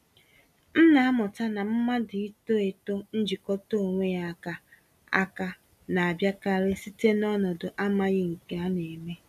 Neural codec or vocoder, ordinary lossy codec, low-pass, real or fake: none; none; 14.4 kHz; real